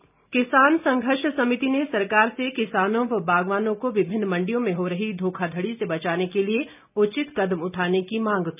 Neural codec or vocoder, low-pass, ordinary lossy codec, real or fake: none; 3.6 kHz; none; real